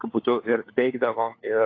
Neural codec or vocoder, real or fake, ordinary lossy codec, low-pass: codec, 16 kHz, 4 kbps, FunCodec, trained on LibriTTS, 50 frames a second; fake; AAC, 32 kbps; 7.2 kHz